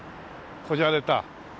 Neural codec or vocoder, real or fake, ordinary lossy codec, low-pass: none; real; none; none